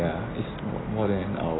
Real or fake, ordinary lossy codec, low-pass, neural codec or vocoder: real; AAC, 16 kbps; 7.2 kHz; none